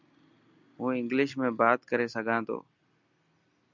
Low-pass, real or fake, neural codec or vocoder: 7.2 kHz; real; none